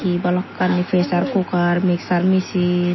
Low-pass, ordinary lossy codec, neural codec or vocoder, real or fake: 7.2 kHz; MP3, 24 kbps; none; real